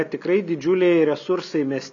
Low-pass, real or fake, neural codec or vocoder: 7.2 kHz; real; none